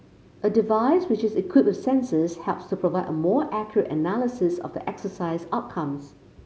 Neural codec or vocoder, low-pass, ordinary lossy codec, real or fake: none; none; none; real